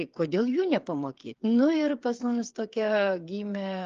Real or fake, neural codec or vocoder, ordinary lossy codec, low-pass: fake; codec, 16 kHz, 8 kbps, FreqCodec, smaller model; Opus, 32 kbps; 7.2 kHz